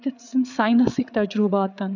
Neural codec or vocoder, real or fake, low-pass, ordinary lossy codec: codec, 16 kHz, 8 kbps, FreqCodec, larger model; fake; 7.2 kHz; none